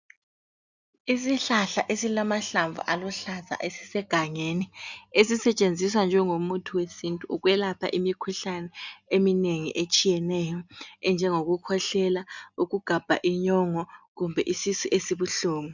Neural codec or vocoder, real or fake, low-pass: none; real; 7.2 kHz